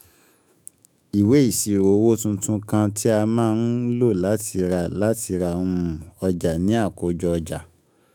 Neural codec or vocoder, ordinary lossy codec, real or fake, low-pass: autoencoder, 48 kHz, 128 numbers a frame, DAC-VAE, trained on Japanese speech; none; fake; none